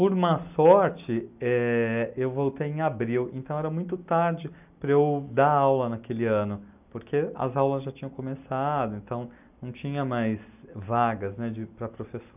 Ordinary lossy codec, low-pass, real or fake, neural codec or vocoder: none; 3.6 kHz; real; none